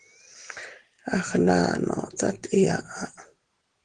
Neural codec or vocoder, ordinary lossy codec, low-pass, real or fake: none; Opus, 16 kbps; 9.9 kHz; real